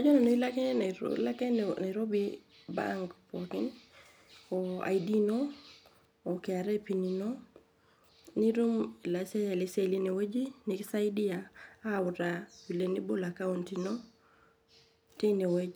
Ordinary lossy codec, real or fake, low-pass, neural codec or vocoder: none; real; none; none